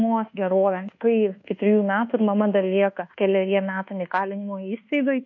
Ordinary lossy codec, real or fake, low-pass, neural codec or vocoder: MP3, 48 kbps; fake; 7.2 kHz; codec, 24 kHz, 1.2 kbps, DualCodec